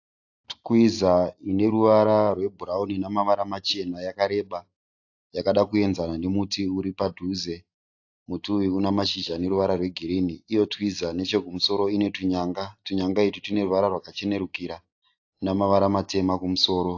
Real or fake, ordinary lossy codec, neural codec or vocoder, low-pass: real; AAC, 48 kbps; none; 7.2 kHz